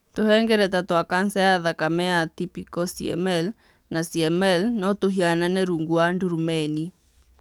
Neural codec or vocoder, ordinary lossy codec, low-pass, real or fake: codec, 44.1 kHz, 7.8 kbps, DAC; none; 19.8 kHz; fake